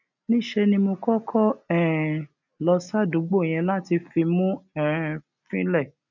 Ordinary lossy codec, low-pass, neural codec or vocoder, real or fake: none; 7.2 kHz; none; real